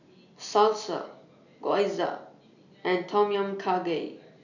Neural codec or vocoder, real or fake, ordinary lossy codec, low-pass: none; real; none; 7.2 kHz